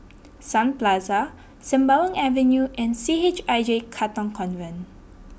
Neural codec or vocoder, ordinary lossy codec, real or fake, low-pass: none; none; real; none